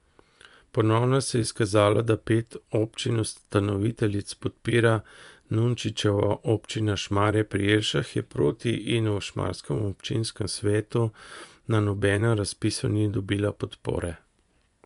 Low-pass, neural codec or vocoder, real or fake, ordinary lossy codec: 10.8 kHz; vocoder, 24 kHz, 100 mel bands, Vocos; fake; none